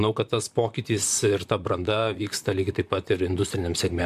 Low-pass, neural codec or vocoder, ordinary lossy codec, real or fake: 14.4 kHz; vocoder, 44.1 kHz, 128 mel bands, Pupu-Vocoder; MP3, 96 kbps; fake